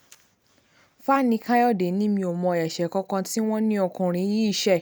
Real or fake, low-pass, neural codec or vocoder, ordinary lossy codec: real; none; none; none